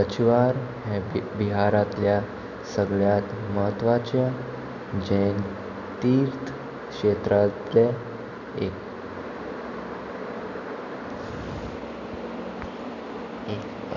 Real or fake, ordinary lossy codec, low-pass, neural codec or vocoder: real; none; 7.2 kHz; none